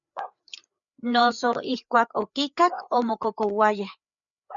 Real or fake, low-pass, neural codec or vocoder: fake; 7.2 kHz; codec, 16 kHz, 4 kbps, FreqCodec, larger model